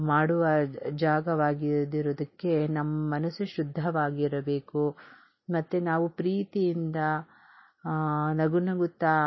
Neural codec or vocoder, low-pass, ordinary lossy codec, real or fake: none; 7.2 kHz; MP3, 24 kbps; real